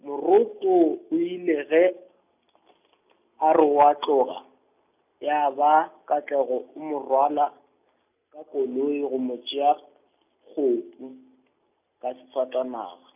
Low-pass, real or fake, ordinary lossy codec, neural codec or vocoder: 3.6 kHz; real; none; none